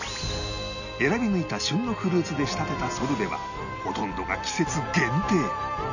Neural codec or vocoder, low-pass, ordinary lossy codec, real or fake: none; 7.2 kHz; none; real